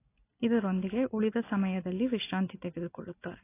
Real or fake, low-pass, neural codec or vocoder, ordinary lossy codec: real; 3.6 kHz; none; AAC, 24 kbps